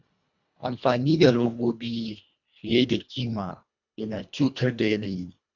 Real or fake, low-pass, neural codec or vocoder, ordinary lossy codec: fake; 7.2 kHz; codec, 24 kHz, 1.5 kbps, HILCodec; none